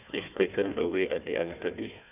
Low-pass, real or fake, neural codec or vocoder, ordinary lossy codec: 3.6 kHz; fake; codec, 16 kHz, 1 kbps, FunCodec, trained on Chinese and English, 50 frames a second; none